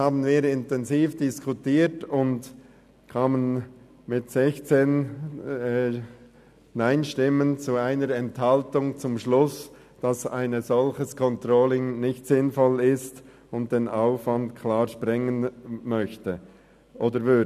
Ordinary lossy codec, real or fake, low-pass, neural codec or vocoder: none; real; 14.4 kHz; none